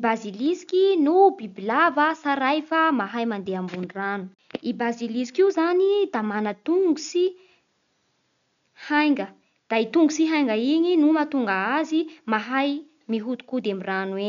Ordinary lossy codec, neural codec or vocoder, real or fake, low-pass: none; none; real; 7.2 kHz